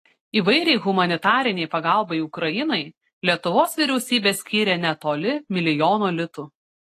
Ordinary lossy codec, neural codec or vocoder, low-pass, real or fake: AAC, 48 kbps; none; 14.4 kHz; real